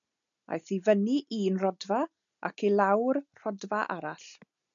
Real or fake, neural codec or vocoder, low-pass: real; none; 7.2 kHz